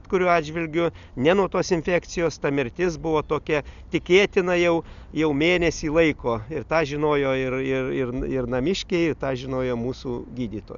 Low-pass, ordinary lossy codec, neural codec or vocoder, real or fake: 7.2 kHz; MP3, 96 kbps; none; real